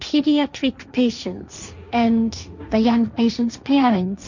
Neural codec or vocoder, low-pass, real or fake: codec, 16 kHz, 1.1 kbps, Voila-Tokenizer; 7.2 kHz; fake